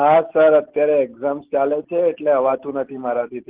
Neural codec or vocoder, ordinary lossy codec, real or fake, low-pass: none; Opus, 16 kbps; real; 3.6 kHz